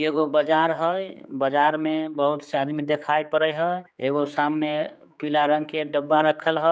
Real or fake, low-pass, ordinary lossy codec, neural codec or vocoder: fake; none; none; codec, 16 kHz, 4 kbps, X-Codec, HuBERT features, trained on general audio